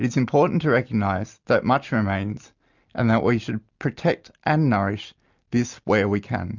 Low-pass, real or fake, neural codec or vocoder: 7.2 kHz; real; none